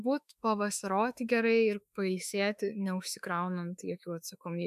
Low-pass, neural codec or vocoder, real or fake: 14.4 kHz; autoencoder, 48 kHz, 32 numbers a frame, DAC-VAE, trained on Japanese speech; fake